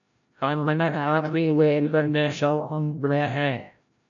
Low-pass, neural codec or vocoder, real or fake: 7.2 kHz; codec, 16 kHz, 0.5 kbps, FreqCodec, larger model; fake